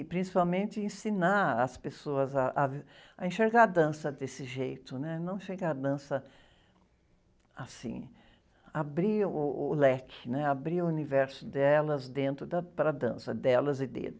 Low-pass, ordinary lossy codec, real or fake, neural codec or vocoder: none; none; real; none